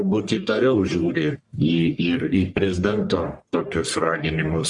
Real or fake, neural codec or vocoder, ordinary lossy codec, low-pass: fake; codec, 44.1 kHz, 1.7 kbps, Pupu-Codec; Opus, 64 kbps; 10.8 kHz